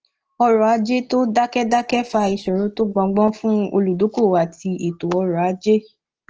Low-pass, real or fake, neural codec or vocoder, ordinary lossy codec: 7.2 kHz; real; none; Opus, 16 kbps